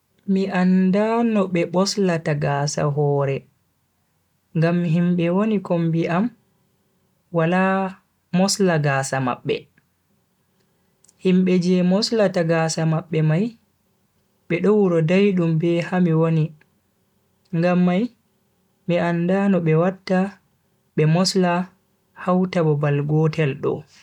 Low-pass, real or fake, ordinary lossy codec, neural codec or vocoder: 19.8 kHz; real; none; none